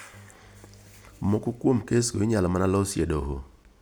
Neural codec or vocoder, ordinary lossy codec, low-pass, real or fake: none; none; none; real